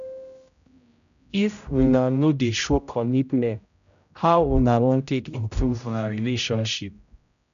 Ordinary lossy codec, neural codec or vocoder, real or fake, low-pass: none; codec, 16 kHz, 0.5 kbps, X-Codec, HuBERT features, trained on general audio; fake; 7.2 kHz